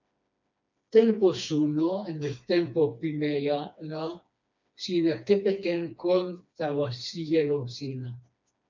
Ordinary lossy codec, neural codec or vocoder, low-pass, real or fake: MP3, 64 kbps; codec, 16 kHz, 2 kbps, FreqCodec, smaller model; 7.2 kHz; fake